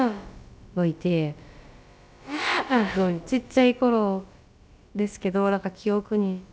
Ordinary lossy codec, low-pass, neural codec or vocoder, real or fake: none; none; codec, 16 kHz, about 1 kbps, DyCAST, with the encoder's durations; fake